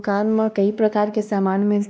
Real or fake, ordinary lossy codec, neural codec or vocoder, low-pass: fake; none; codec, 16 kHz, 1 kbps, X-Codec, WavLM features, trained on Multilingual LibriSpeech; none